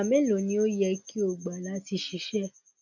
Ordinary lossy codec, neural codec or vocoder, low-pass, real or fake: none; none; 7.2 kHz; real